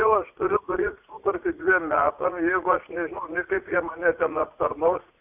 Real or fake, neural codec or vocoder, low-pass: fake; vocoder, 44.1 kHz, 80 mel bands, Vocos; 3.6 kHz